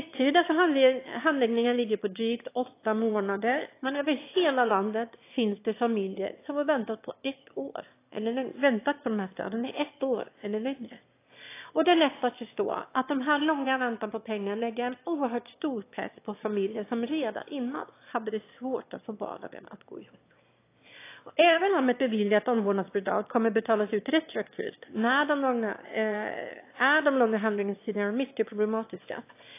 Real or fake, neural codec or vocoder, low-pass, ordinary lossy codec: fake; autoencoder, 22.05 kHz, a latent of 192 numbers a frame, VITS, trained on one speaker; 3.6 kHz; AAC, 24 kbps